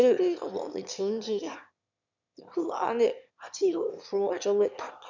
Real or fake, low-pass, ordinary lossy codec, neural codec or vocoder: fake; 7.2 kHz; none; autoencoder, 22.05 kHz, a latent of 192 numbers a frame, VITS, trained on one speaker